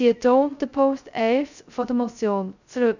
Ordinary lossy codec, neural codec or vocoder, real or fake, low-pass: none; codec, 16 kHz, 0.2 kbps, FocalCodec; fake; 7.2 kHz